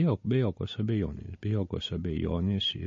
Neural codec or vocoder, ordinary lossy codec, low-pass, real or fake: none; MP3, 32 kbps; 7.2 kHz; real